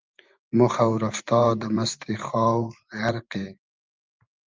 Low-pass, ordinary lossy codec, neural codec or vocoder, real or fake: 7.2 kHz; Opus, 24 kbps; vocoder, 44.1 kHz, 128 mel bands every 512 samples, BigVGAN v2; fake